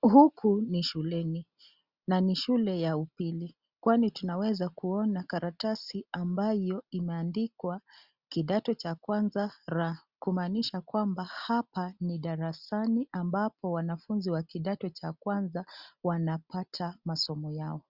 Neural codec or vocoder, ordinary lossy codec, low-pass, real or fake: none; Opus, 64 kbps; 5.4 kHz; real